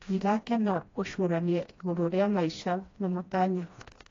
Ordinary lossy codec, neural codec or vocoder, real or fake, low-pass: AAC, 32 kbps; codec, 16 kHz, 1 kbps, FreqCodec, smaller model; fake; 7.2 kHz